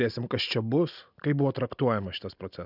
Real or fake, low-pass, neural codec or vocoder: real; 5.4 kHz; none